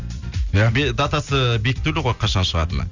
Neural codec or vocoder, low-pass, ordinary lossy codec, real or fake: none; 7.2 kHz; none; real